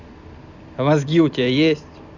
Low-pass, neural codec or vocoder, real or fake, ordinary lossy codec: 7.2 kHz; vocoder, 44.1 kHz, 128 mel bands every 256 samples, BigVGAN v2; fake; none